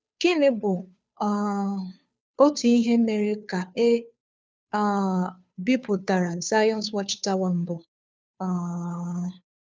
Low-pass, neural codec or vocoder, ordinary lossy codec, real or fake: none; codec, 16 kHz, 2 kbps, FunCodec, trained on Chinese and English, 25 frames a second; none; fake